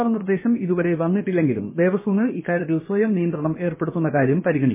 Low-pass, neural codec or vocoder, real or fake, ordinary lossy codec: 3.6 kHz; codec, 16 kHz, about 1 kbps, DyCAST, with the encoder's durations; fake; MP3, 16 kbps